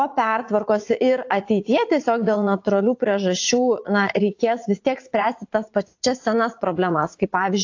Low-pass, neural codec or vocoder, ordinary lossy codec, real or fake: 7.2 kHz; vocoder, 44.1 kHz, 80 mel bands, Vocos; AAC, 48 kbps; fake